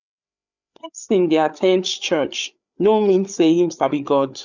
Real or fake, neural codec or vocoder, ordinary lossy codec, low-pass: fake; codec, 16 kHz, 8 kbps, FreqCodec, larger model; none; 7.2 kHz